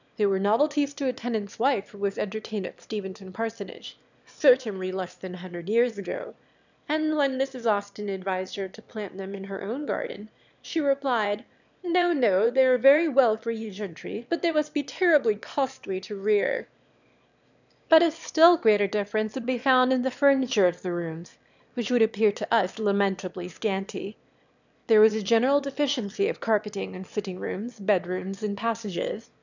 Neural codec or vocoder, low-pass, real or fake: autoencoder, 22.05 kHz, a latent of 192 numbers a frame, VITS, trained on one speaker; 7.2 kHz; fake